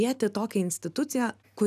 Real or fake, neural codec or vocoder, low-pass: real; none; 14.4 kHz